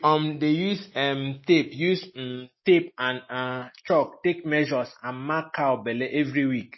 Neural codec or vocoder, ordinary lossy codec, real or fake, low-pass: none; MP3, 24 kbps; real; 7.2 kHz